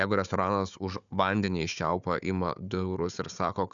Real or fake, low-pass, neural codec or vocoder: fake; 7.2 kHz; codec, 16 kHz, 4 kbps, FunCodec, trained on Chinese and English, 50 frames a second